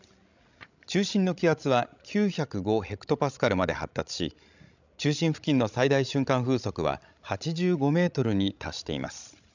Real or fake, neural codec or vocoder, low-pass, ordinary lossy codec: fake; codec, 16 kHz, 16 kbps, FreqCodec, larger model; 7.2 kHz; none